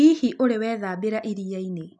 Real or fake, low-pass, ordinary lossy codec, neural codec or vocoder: real; 10.8 kHz; none; none